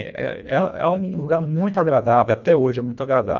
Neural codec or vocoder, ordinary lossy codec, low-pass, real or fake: codec, 24 kHz, 1.5 kbps, HILCodec; none; 7.2 kHz; fake